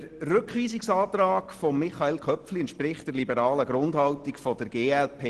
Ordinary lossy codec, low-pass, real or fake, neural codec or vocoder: Opus, 16 kbps; 10.8 kHz; real; none